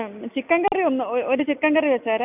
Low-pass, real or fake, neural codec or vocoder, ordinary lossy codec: 3.6 kHz; real; none; none